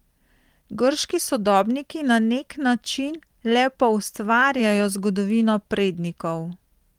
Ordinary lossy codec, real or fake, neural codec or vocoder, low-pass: Opus, 24 kbps; fake; vocoder, 44.1 kHz, 128 mel bands every 512 samples, BigVGAN v2; 19.8 kHz